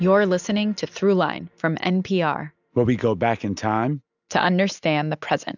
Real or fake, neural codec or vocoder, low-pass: real; none; 7.2 kHz